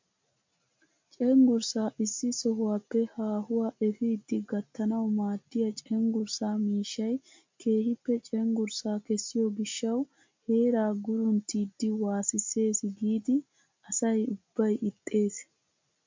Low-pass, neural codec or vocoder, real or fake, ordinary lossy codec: 7.2 kHz; none; real; MP3, 48 kbps